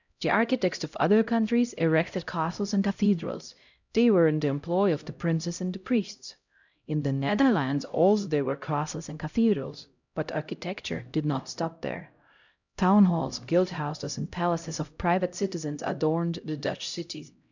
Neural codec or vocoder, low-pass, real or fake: codec, 16 kHz, 0.5 kbps, X-Codec, HuBERT features, trained on LibriSpeech; 7.2 kHz; fake